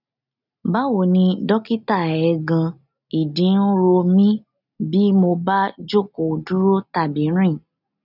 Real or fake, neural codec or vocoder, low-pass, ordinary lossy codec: real; none; 5.4 kHz; none